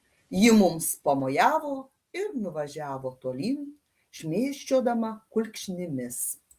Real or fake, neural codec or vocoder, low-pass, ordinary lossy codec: real; none; 14.4 kHz; Opus, 32 kbps